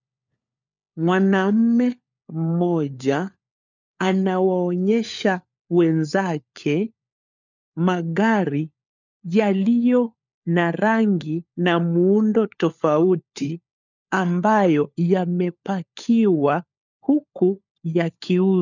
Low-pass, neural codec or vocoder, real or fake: 7.2 kHz; codec, 16 kHz, 4 kbps, FunCodec, trained on LibriTTS, 50 frames a second; fake